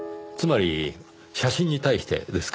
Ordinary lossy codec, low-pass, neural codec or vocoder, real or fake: none; none; none; real